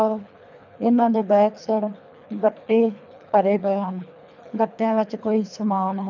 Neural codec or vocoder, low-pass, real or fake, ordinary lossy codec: codec, 24 kHz, 3 kbps, HILCodec; 7.2 kHz; fake; none